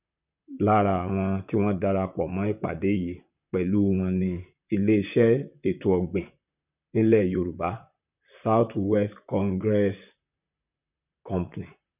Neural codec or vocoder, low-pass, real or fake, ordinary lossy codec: vocoder, 44.1 kHz, 128 mel bands, Pupu-Vocoder; 3.6 kHz; fake; none